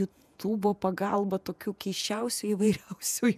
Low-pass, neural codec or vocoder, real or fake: 14.4 kHz; vocoder, 48 kHz, 128 mel bands, Vocos; fake